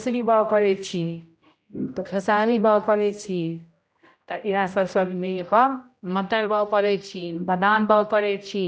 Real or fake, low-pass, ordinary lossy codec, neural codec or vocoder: fake; none; none; codec, 16 kHz, 0.5 kbps, X-Codec, HuBERT features, trained on general audio